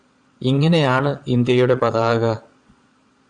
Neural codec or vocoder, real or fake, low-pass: vocoder, 22.05 kHz, 80 mel bands, Vocos; fake; 9.9 kHz